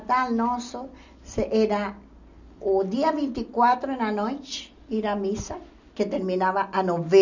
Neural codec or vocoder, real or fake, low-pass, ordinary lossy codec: none; real; 7.2 kHz; none